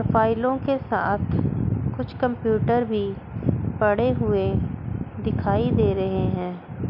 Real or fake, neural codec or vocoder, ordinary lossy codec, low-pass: real; none; none; 5.4 kHz